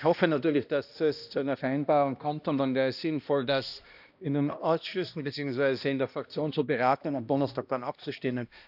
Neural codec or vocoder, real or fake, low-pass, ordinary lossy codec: codec, 16 kHz, 1 kbps, X-Codec, HuBERT features, trained on balanced general audio; fake; 5.4 kHz; none